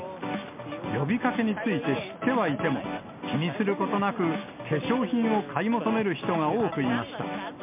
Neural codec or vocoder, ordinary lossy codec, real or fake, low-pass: none; MP3, 32 kbps; real; 3.6 kHz